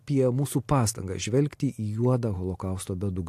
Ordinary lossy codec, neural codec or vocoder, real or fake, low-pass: AAC, 64 kbps; none; real; 14.4 kHz